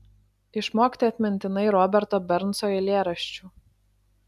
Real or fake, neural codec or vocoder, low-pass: real; none; 14.4 kHz